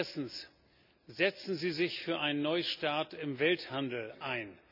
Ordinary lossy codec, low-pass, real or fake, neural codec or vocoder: none; 5.4 kHz; real; none